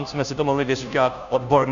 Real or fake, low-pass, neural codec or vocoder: fake; 7.2 kHz; codec, 16 kHz, 0.5 kbps, FunCodec, trained on Chinese and English, 25 frames a second